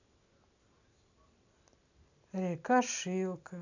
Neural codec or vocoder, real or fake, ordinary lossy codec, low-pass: none; real; none; 7.2 kHz